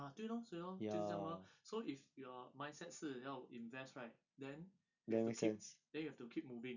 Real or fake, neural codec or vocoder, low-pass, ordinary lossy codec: real; none; 7.2 kHz; none